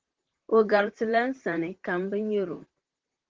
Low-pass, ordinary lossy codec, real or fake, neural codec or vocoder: 7.2 kHz; Opus, 16 kbps; fake; vocoder, 44.1 kHz, 128 mel bands, Pupu-Vocoder